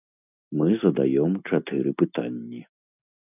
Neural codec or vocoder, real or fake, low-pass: none; real; 3.6 kHz